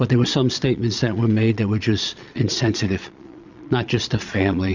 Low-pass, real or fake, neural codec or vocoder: 7.2 kHz; fake; vocoder, 22.05 kHz, 80 mel bands, Vocos